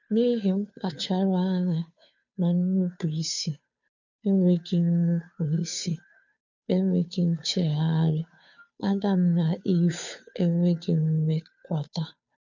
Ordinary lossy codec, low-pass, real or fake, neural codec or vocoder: none; 7.2 kHz; fake; codec, 16 kHz, 2 kbps, FunCodec, trained on Chinese and English, 25 frames a second